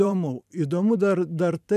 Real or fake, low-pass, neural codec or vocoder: fake; 14.4 kHz; vocoder, 44.1 kHz, 128 mel bands every 512 samples, BigVGAN v2